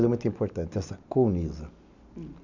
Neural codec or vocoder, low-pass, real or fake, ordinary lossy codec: none; 7.2 kHz; real; none